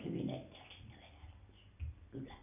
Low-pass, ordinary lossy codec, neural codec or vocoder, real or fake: 3.6 kHz; none; none; real